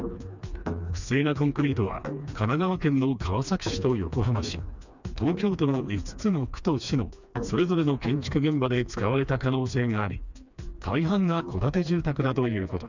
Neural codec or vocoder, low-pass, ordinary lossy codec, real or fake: codec, 16 kHz, 2 kbps, FreqCodec, smaller model; 7.2 kHz; none; fake